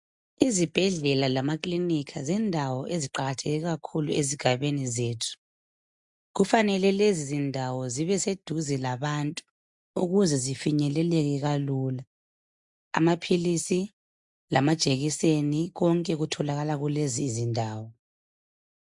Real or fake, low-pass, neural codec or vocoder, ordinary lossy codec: real; 10.8 kHz; none; MP3, 64 kbps